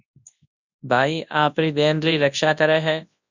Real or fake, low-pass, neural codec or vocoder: fake; 7.2 kHz; codec, 24 kHz, 0.9 kbps, WavTokenizer, large speech release